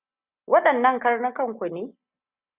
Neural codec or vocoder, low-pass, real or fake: none; 3.6 kHz; real